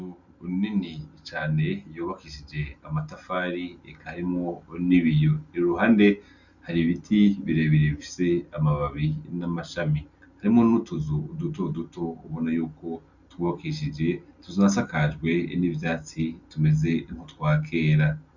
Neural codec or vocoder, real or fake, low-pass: none; real; 7.2 kHz